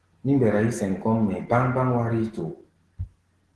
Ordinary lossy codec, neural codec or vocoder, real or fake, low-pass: Opus, 16 kbps; none; real; 10.8 kHz